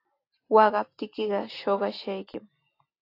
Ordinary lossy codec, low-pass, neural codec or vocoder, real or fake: AAC, 32 kbps; 5.4 kHz; none; real